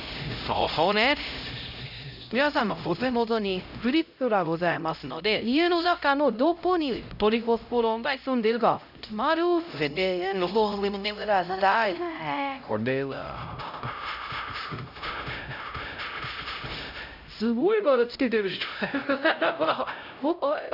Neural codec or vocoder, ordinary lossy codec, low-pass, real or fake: codec, 16 kHz, 0.5 kbps, X-Codec, HuBERT features, trained on LibriSpeech; none; 5.4 kHz; fake